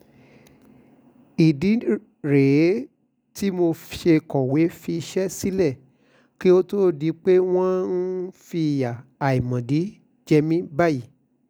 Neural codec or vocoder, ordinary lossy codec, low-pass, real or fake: none; none; none; real